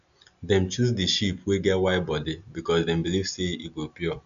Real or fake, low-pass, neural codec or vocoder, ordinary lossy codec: real; 7.2 kHz; none; none